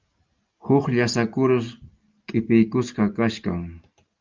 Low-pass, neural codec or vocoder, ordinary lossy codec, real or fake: 7.2 kHz; none; Opus, 24 kbps; real